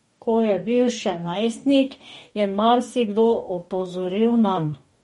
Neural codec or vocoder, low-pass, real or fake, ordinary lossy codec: codec, 44.1 kHz, 2.6 kbps, DAC; 19.8 kHz; fake; MP3, 48 kbps